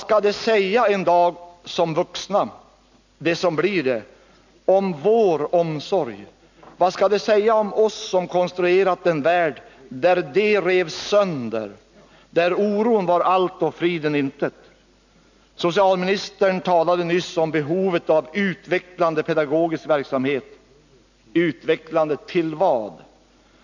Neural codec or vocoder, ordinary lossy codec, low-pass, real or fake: none; none; 7.2 kHz; real